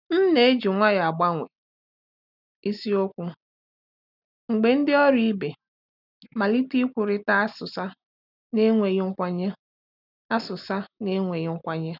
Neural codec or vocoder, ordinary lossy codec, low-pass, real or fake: none; none; 5.4 kHz; real